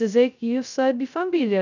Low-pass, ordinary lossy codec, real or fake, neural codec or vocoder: 7.2 kHz; none; fake; codec, 16 kHz, 0.2 kbps, FocalCodec